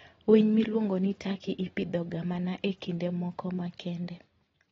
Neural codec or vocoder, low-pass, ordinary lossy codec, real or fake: none; 7.2 kHz; AAC, 24 kbps; real